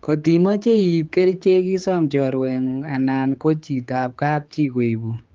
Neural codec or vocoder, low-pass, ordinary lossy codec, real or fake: codec, 16 kHz, 4 kbps, X-Codec, HuBERT features, trained on general audio; 7.2 kHz; Opus, 32 kbps; fake